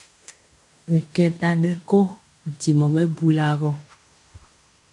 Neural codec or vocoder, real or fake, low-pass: codec, 16 kHz in and 24 kHz out, 0.9 kbps, LongCat-Audio-Codec, fine tuned four codebook decoder; fake; 10.8 kHz